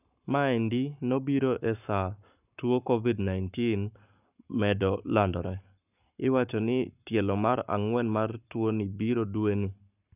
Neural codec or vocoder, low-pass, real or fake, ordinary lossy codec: codec, 44.1 kHz, 7.8 kbps, Pupu-Codec; 3.6 kHz; fake; none